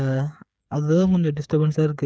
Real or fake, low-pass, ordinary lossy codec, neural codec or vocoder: fake; none; none; codec, 16 kHz, 8 kbps, FreqCodec, smaller model